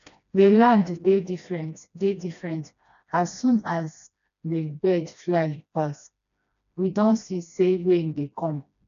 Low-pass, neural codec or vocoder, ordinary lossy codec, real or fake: 7.2 kHz; codec, 16 kHz, 1 kbps, FreqCodec, smaller model; none; fake